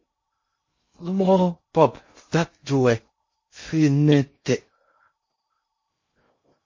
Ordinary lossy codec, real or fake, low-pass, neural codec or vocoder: MP3, 32 kbps; fake; 7.2 kHz; codec, 16 kHz in and 24 kHz out, 0.6 kbps, FocalCodec, streaming, 2048 codes